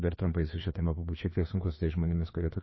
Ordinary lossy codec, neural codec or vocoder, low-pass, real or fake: MP3, 24 kbps; codec, 16 kHz, 2 kbps, FreqCodec, larger model; 7.2 kHz; fake